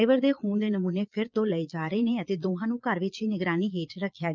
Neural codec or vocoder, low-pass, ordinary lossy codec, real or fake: vocoder, 22.05 kHz, 80 mel bands, Vocos; 7.2 kHz; Opus, 24 kbps; fake